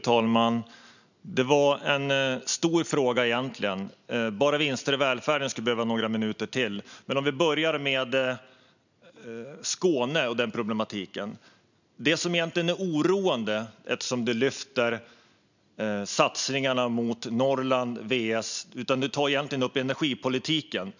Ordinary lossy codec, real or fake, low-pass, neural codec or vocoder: none; real; 7.2 kHz; none